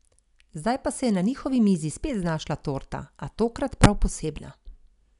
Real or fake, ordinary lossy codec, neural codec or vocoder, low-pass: real; none; none; 10.8 kHz